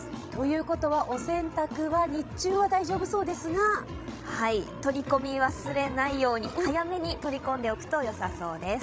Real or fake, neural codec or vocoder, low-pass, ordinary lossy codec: fake; codec, 16 kHz, 16 kbps, FreqCodec, larger model; none; none